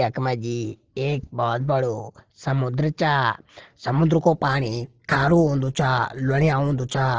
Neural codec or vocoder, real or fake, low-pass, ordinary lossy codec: none; real; 7.2 kHz; Opus, 16 kbps